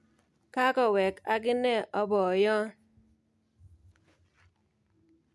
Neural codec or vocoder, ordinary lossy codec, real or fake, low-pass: none; none; real; none